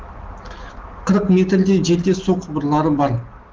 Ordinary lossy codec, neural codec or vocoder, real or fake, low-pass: Opus, 16 kbps; none; real; 7.2 kHz